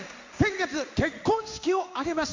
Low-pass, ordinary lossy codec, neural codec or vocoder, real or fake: 7.2 kHz; MP3, 64 kbps; codec, 16 kHz in and 24 kHz out, 1 kbps, XY-Tokenizer; fake